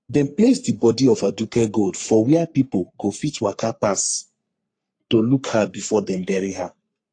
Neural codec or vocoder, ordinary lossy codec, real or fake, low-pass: codec, 44.1 kHz, 3.4 kbps, Pupu-Codec; AAC, 48 kbps; fake; 9.9 kHz